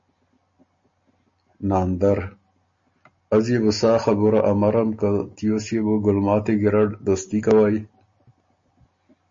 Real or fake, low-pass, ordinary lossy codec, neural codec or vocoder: real; 7.2 kHz; MP3, 32 kbps; none